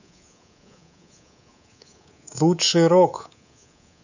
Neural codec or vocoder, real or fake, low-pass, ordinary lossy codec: codec, 24 kHz, 3.1 kbps, DualCodec; fake; 7.2 kHz; none